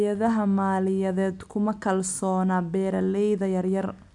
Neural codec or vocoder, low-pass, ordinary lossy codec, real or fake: none; 10.8 kHz; none; real